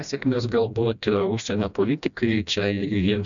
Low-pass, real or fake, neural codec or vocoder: 7.2 kHz; fake; codec, 16 kHz, 1 kbps, FreqCodec, smaller model